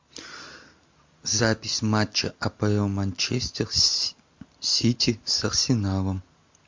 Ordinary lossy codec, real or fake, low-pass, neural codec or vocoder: MP3, 48 kbps; real; 7.2 kHz; none